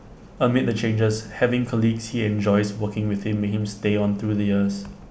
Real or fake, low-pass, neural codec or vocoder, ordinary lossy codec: real; none; none; none